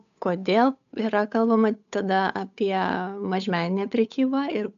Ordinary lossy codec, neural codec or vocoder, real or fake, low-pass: MP3, 96 kbps; codec, 16 kHz, 8 kbps, FunCodec, trained on Chinese and English, 25 frames a second; fake; 7.2 kHz